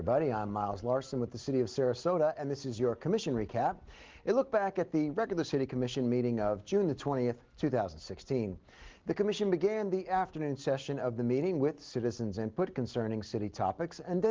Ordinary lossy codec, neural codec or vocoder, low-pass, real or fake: Opus, 16 kbps; none; 7.2 kHz; real